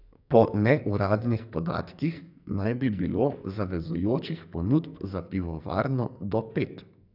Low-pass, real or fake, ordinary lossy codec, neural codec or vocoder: 5.4 kHz; fake; none; codec, 44.1 kHz, 2.6 kbps, SNAC